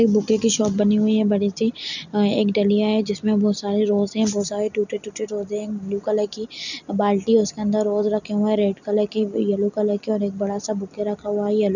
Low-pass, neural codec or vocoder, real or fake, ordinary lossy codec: 7.2 kHz; none; real; none